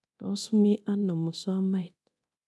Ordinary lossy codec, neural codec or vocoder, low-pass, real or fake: none; codec, 24 kHz, 0.9 kbps, DualCodec; none; fake